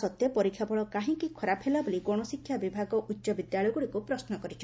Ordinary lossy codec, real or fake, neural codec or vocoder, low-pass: none; real; none; none